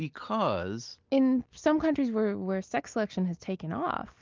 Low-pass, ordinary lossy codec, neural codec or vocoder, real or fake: 7.2 kHz; Opus, 32 kbps; none; real